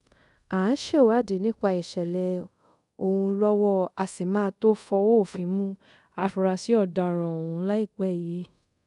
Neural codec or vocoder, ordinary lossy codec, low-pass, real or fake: codec, 24 kHz, 0.5 kbps, DualCodec; none; 10.8 kHz; fake